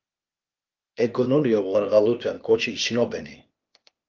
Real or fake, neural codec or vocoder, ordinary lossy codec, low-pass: fake; codec, 16 kHz, 0.8 kbps, ZipCodec; Opus, 24 kbps; 7.2 kHz